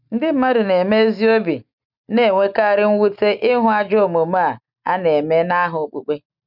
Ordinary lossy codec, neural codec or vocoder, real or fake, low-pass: none; none; real; 5.4 kHz